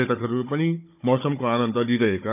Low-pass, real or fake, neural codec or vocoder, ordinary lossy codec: 3.6 kHz; fake; codec, 16 kHz, 16 kbps, FunCodec, trained on Chinese and English, 50 frames a second; none